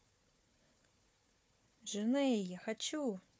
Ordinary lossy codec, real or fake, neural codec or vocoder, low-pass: none; fake; codec, 16 kHz, 4 kbps, FunCodec, trained on Chinese and English, 50 frames a second; none